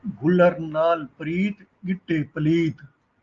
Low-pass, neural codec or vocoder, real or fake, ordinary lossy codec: 7.2 kHz; none; real; Opus, 24 kbps